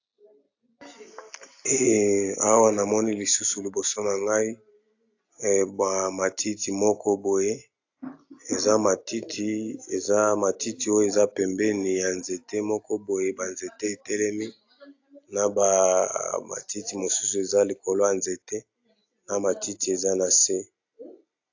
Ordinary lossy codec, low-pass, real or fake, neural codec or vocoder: AAC, 48 kbps; 7.2 kHz; real; none